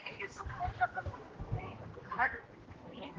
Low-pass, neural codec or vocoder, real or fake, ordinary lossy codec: 7.2 kHz; codec, 16 kHz, 1 kbps, X-Codec, HuBERT features, trained on general audio; fake; Opus, 16 kbps